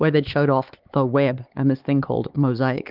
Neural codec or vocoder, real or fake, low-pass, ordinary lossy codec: codec, 16 kHz, 4 kbps, X-Codec, HuBERT features, trained on LibriSpeech; fake; 5.4 kHz; Opus, 32 kbps